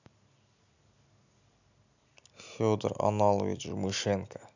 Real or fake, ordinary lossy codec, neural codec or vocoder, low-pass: real; none; none; 7.2 kHz